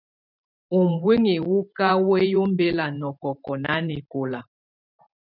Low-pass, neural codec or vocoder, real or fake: 5.4 kHz; vocoder, 44.1 kHz, 128 mel bands every 512 samples, BigVGAN v2; fake